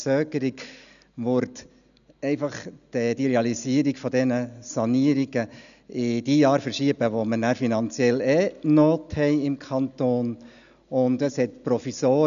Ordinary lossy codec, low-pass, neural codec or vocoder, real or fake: none; 7.2 kHz; none; real